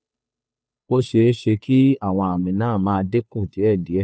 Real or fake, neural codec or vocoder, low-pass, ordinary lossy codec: fake; codec, 16 kHz, 2 kbps, FunCodec, trained on Chinese and English, 25 frames a second; none; none